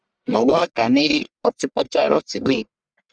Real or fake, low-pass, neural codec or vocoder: fake; 9.9 kHz; codec, 44.1 kHz, 1.7 kbps, Pupu-Codec